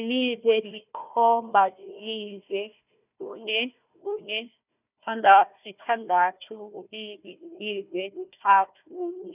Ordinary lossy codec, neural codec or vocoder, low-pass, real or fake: none; codec, 16 kHz, 1 kbps, FunCodec, trained on Chinese and English, 50 frames a second; 3.6 kHz; fake